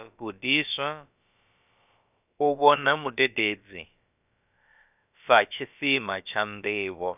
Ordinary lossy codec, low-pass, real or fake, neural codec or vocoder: none; 3.6 kHz; fake; codec, 16 kHz, about 1 kbps, DyCAST, with the encoder's durations